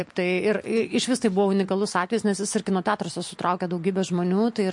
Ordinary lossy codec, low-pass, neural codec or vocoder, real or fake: MP3, 48 kbps; 10.8 kHz; none; real